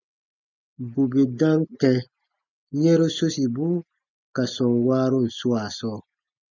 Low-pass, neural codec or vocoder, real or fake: 7.2 kHz; none; real